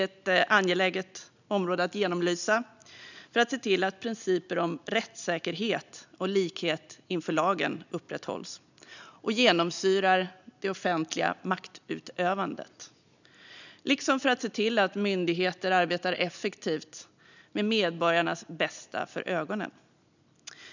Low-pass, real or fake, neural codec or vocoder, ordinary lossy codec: 7.2 kHz; real; none; none